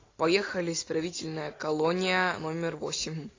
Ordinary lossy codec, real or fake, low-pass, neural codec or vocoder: AAC, 32 kbps; real; 7.2 kHz; none